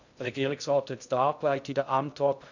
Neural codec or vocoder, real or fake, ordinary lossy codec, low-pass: codec, 16 kHz in and 24 kHz out, 0.6 kbps, FocalCodec, streaming, 2048 codes; fake; none; 7.2 kHz